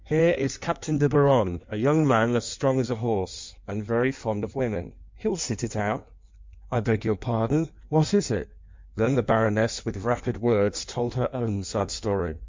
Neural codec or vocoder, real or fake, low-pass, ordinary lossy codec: codec, 16 kHz in and 24 kHz out, 1.1 kbps, FireRedTTS-2 codec; fake; 7.2 kHz; MP3, 64 kbps